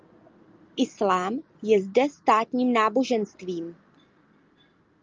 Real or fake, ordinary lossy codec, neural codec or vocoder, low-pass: real; Opus, 24 kbps; none; 7.2 kHz